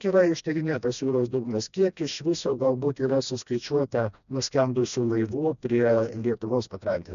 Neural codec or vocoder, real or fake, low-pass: codec, 16 kHz, 1 kbps, FreqCodec, smaller model; fake; 7.2 kHz